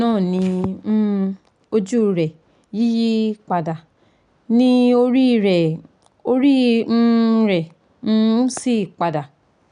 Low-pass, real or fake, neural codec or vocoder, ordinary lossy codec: 9.9 kHz; real; none; none